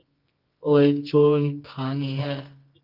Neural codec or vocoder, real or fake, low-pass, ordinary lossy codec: codec, 24 kHz, 0.9 kbps, WavTokenizer, medium music audio release; fake; 5.4 kHz; Opus, 24 kbps